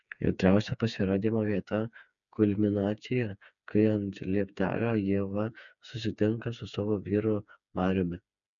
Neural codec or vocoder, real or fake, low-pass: codec, 16 kHz, 4 kbps, FreqCodec, smaller model; fake; 7.2 kHz